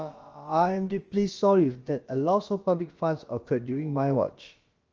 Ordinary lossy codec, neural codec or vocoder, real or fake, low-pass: Opus, 24 kbps; codec, 16 kHz, about 1 kbps, DyCAST, with the encoder's durations; fake; 7.2 kHz